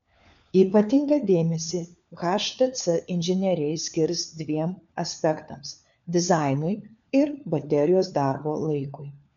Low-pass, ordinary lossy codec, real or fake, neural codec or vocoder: 7.2 kHz; MP3, 96 kbps; fake; codec, 16 kHz, 4 kbps, FunCodec, trained on LibriTTS, 50 frames a second